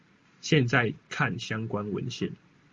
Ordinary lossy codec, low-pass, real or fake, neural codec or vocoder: Opus, 32 kbps; 7.2 kHz; real; none